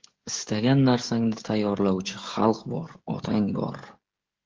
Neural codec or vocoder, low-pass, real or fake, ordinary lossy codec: codec, 16 kHz, 16 kbps, FreqCodec, smaller model; 7.2 kHz; fake; Opus, 16 kbps